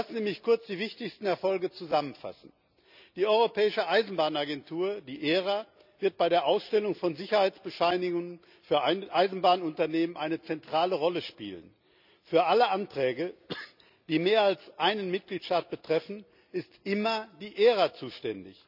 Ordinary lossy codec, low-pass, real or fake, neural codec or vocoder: none; 5.4 kHz; real; none